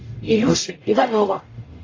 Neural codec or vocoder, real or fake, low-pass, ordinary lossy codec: codec, 44.1 kHz, 0.9 kbps, DAC; fake; 7.2 kHz; AAC, 32 kbps